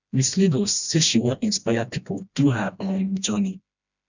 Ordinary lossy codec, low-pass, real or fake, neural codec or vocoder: none; 7.2 kHz; fake; codec, 16 kHz, 1 kbps, FreqCodec, smaller model